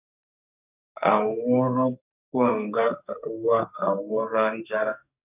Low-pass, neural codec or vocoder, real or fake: 3.6 kHz; codec, 44.1 kHz, 2.6 kbps, SNAC; fake